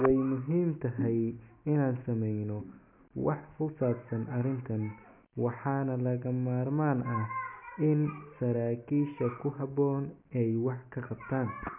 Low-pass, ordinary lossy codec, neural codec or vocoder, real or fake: 3.6 kHz; MP3, 32 kbps; none; real